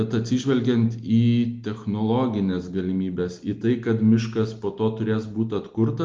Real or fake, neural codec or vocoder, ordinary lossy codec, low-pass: real; none; Opus, 32 kbps; 7.2 kHz